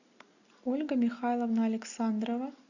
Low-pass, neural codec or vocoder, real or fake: 7.2 kHz; none; real